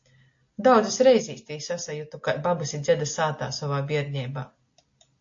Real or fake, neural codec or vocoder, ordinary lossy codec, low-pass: real; none; AAC, 48 kbps; 7.2 kHz